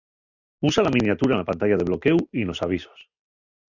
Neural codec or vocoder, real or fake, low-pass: none; real; 7.2 kHz